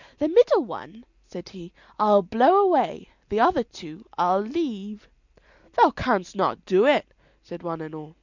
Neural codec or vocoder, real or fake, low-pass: none; real; 7.2 kHz